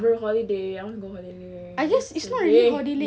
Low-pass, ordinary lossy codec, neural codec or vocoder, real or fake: none; none; none; real